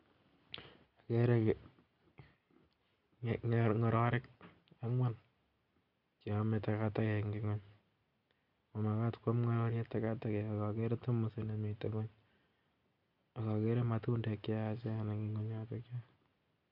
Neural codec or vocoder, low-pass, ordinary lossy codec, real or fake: none; 5.4 kHz; none; real